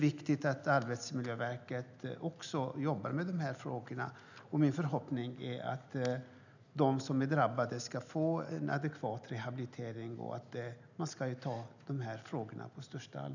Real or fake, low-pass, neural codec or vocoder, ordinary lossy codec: real; 7.2 kHz; none; none